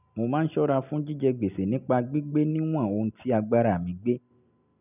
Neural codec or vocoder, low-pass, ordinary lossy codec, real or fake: none; 3.6 kHz; none; real